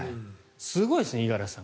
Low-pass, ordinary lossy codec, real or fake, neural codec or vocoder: none; none; real; none